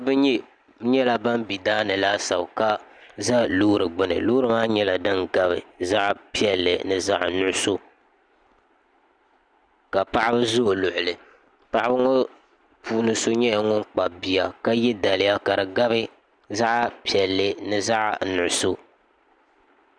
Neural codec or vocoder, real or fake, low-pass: none; real; 9.9 kHz